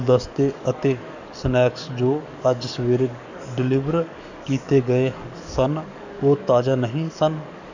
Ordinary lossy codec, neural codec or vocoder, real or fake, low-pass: none; codec, 44.1 kHz, 7.8 kbps, DAC; fake; 7.2 kHz